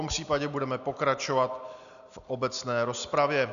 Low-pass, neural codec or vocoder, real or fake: 7.2 kHz; none; real